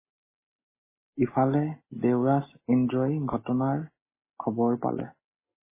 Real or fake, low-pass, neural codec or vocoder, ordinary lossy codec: real; 3.6 kHz; none; MP3, 16 kbps